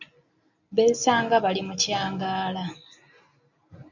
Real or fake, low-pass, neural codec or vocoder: real; 7.2 kHz; none